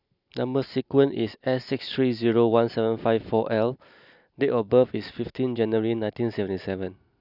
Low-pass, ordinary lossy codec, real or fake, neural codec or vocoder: 5.4 kHz; none; real; none